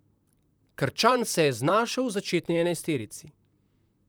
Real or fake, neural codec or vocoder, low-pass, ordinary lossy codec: fake; vocoder, 44.1 kHz, 128 mel bands, Pupu-Vocoder; none; none